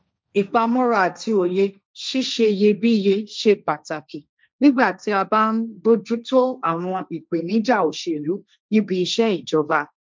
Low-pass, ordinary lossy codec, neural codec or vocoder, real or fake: none; none; codec, 16 kHz, 1.1 kbps, Voila-Tokenizer; fake